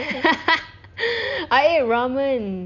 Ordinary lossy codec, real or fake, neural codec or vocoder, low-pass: none; real; none; 7.2 kHz